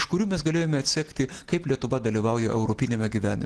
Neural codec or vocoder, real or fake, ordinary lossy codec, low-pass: none; real; Opus, 16 kbps; 10.8 kHz